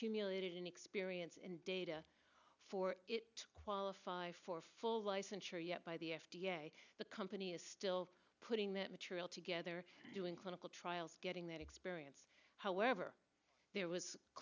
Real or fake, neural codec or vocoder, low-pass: real; none; 7.2 kHz